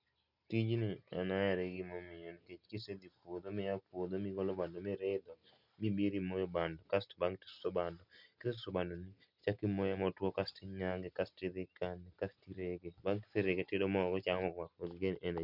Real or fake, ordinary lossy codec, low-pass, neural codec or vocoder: real; none; 5.4 kHz; none